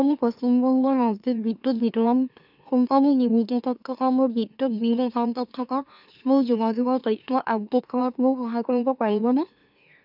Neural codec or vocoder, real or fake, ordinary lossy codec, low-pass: autoencoder, 44.1 kHz, a latent of 192 numbers a frame, MeloTTS; fake; none; 5.4 kHz